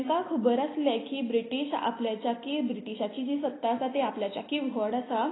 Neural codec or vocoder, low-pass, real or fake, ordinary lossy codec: none; 7.2 kHz; real; AAC, 16 kbps